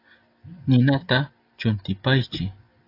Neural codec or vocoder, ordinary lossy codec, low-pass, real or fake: none; AAC, 32 kbps; 5.4 kHz; real